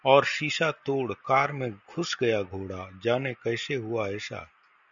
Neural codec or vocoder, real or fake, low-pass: none; real; 7.2 kHz